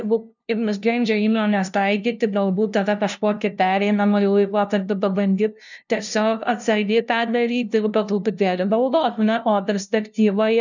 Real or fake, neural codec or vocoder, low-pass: fake; codec, 16 kHz, 0.5 kbps, FunCodec, trained on LibriTTS, 25 frames a second; 7.2 kHz